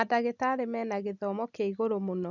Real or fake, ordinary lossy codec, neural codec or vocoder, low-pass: real; none; none; 7.2 kHz